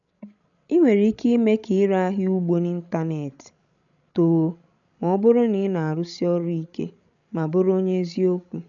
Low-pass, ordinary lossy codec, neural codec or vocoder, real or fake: 7.2 kHz; none; codec, 16 kHz, 16 kbps, FreqCodec, larger model; fake